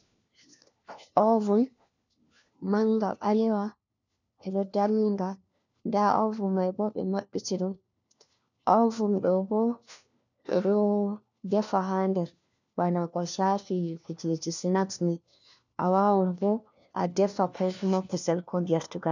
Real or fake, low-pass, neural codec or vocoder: fake; 7.2 kHz; codec, 16 kHz, 1 kbps, FunCodec, trained on LibriTTS, 50 frames a second